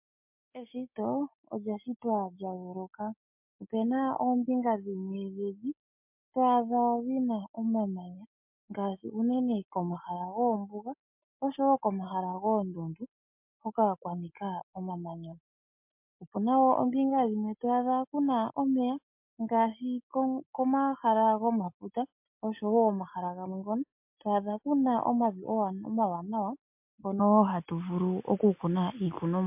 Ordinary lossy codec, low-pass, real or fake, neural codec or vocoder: MP3, 32 kbps; 3.6 kHz; real; none